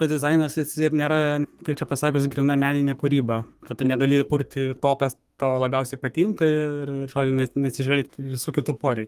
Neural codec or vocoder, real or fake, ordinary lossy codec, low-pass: codec, 32 kHz, 1.9 kbps, SNAC; fake; Opus, 32 kbps; 14.4 kHz